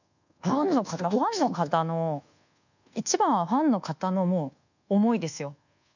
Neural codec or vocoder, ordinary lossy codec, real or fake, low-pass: codec, 24 kHz, 1.2 kbps, DualCodec; none; fake; 7.2 kHz